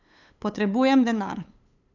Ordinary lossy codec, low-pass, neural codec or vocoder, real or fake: none; 7.2 kHz; codec, 16 kHz, 8 kbps, FunCodec, trained on LibriTTS, 25 frames a second; fake